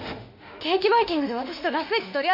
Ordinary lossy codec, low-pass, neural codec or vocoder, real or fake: MP3, 32 kbps; 5.4 kHz; autoencoder, 48 kHz, 32 numbers a frame, DAC-VAE, trained on Japanese speech; fake